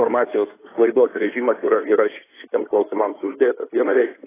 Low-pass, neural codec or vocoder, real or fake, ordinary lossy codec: 3.6 kHz; codec, 16 kHz in and 24 kHz out, 2.2 kbps, FireRedTTS-2 codec; fake; AAC, 16 kbps